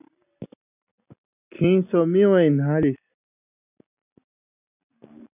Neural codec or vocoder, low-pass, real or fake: none; 3.6 kHz; real